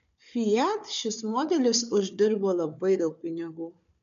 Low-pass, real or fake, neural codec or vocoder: 7.2 kHz; fake; codec, 16 kHz, 4 kbps, FunCodec, trained on Chinese and English, 50 frames a second